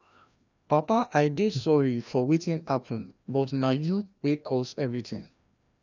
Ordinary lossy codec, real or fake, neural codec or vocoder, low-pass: none; fake; codec, 16 kHz, 1 kbps, FreqCodec, larger model; 7.2 kHz